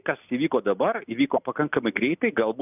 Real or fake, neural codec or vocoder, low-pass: real; none; 3.6 kHz